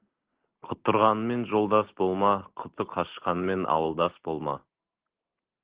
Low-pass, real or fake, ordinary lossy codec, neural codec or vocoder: 3.6 kHz; real; Opus, 16 kbps; none